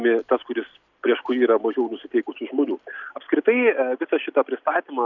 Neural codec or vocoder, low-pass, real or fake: none; 7.2 kHz; real